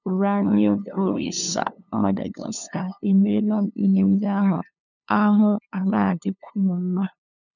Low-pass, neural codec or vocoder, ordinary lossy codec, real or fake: 7.2 kHz; codec, 16 kHz, 2 kbps, FunCodec, trained on LibriTTS, 25 frames a second; none; fake